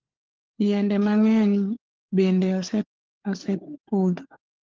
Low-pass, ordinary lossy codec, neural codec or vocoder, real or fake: 7.2 kHz; Opus, 16 kbps; codec, 16 kHz, 4 kbps, FunCodec, trained on LibriTTS, 50 frames a second; fake